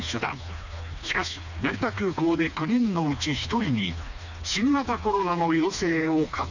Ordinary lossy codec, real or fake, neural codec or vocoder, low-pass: none; fake; codec, 16 kHz, 2 kbps, FreqCodec, smaller model; 7.2 kHz